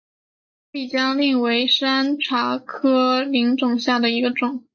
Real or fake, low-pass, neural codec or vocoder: real; 7.2 kHz; none